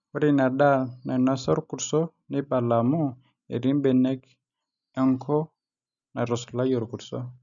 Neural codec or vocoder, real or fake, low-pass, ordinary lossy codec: none; real; 7.2 kHz; none